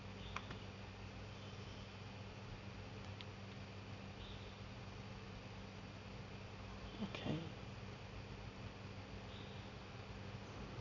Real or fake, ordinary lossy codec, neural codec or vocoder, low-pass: real; none; none; 7.2 kHz